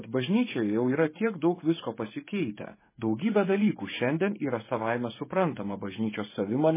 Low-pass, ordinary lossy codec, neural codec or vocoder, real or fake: 3.6 kHz; MP3, 16 kbps; codec, 16 kHz, 16 kbps, FreqCodec, smaller model; fake